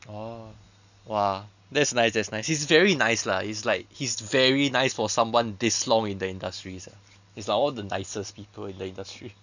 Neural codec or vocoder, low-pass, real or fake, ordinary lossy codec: none; 7.2 kHz; real; none